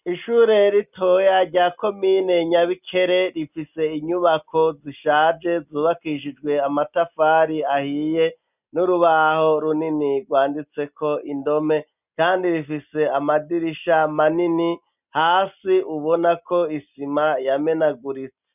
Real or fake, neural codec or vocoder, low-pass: real; none; 3.6 kHz